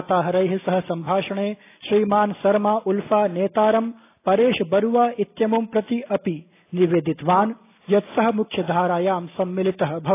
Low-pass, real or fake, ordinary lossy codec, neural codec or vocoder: 3.6 kHz; real; AAC, 24 kbps; none